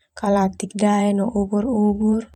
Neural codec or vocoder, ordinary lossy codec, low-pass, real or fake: vocoder, 44.1 kHz, 128 mel bands every 512 samples, BigVGAN v2; none; 19.8 kHz; fake